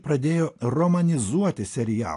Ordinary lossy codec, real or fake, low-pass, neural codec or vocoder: AAC, 48 kbps; real; 10.8 kHz; none